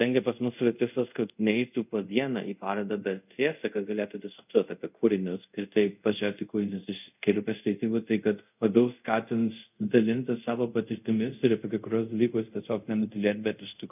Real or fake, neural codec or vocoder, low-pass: fake; codec, 24 kHz, 0.5 kbps, DualCodec; 3.6 kHz